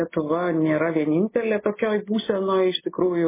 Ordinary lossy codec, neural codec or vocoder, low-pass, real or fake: MP3, 16 kbps; none; 3.6 kHz; real